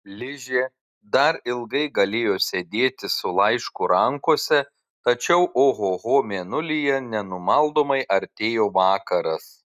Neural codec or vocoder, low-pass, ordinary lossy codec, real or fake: none; 14.4 kHz; Opus, 64 kbps; real